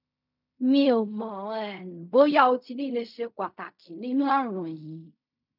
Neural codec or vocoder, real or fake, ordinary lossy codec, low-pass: codec, 16 kHz in and 24 kHz out, 0.4 kbps, LongCat-Audio-Codec, fine tuned four codebook decoder; fake; none; 5.4 kHz